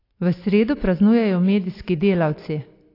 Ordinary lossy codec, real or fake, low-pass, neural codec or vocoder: AAC, 32 kbps; real; 5.4 kHz; none